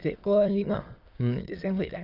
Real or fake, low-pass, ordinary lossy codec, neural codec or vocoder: fake; 5.4 kHz; Opus, 24 kbps; autoencoder, 22.05 kHz, a latent of 192 numbers a frame, VITS, trained on many speakers